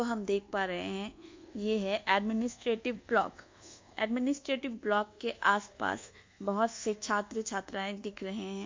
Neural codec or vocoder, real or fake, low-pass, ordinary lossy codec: codec, 24 kHz, 1.2 kbps, DualCodec; fake; 7.2 kHz; MP3, 48 kbps